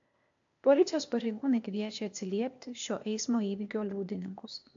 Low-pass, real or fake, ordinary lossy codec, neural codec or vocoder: 7.2 kHz; fake; AAC, 64 kbps; codec, 16 kHz, 0.8 kbps, ZipCodec